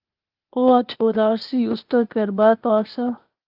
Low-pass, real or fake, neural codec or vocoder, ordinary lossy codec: 5.4 kHz; fake; codec, 16 kHz, 0.8 kbps, ZipCodec; Opus, 32 kbps